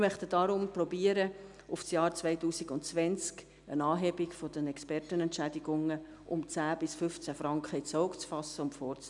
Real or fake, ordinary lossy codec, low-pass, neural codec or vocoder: real; none; 10.8 kHz; none